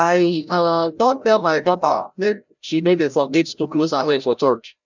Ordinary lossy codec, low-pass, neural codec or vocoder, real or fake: none; 7.2 kHz; codec, 16 kHz, 0.5 kbps, FreqCodec, larger model; fake